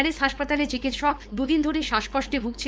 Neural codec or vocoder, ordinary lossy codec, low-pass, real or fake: codec, 16 kHz, 4.8 kbps, FACodec; none; none; fake